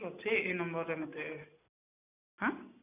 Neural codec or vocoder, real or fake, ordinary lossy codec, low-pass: none; real; none; 3.6 kHz